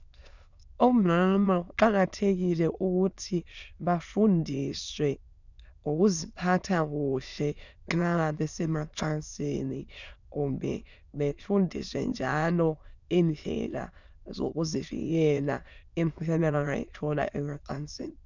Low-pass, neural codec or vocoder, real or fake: 7.2 kHz; autoencoder, 22.05 kHz, a latent of 192 numbers a frame, VITS, trained on many speakers; fake